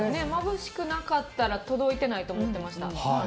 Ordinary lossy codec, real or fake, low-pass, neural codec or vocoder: none; real; none; none